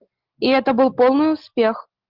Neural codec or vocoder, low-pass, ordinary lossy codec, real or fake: none; 5.4 kHz; Opus, 16 kbps; real